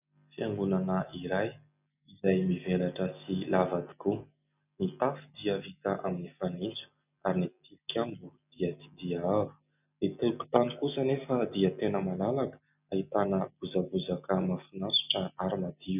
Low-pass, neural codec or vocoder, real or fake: 3.6 kHz; none; real